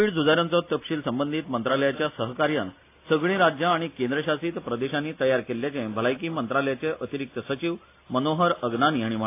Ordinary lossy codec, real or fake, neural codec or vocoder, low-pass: AAC, 24 kbps; real; none; 3.6 kHz